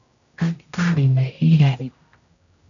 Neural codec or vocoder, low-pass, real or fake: codec, 16 kHz, 0.5 kbps, X-Codec, HuBERT features, trained on general audio; 7.2 kHz; fake